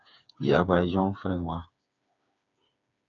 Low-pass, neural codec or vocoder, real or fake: 7.2 kHz; codec, 16 kHz, 4 kbps, FreqCodec, smaller model; fake